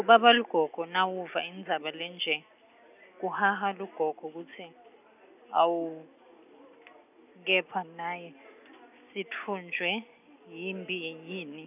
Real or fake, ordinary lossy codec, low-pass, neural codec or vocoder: real; none; 3.6 kHz; none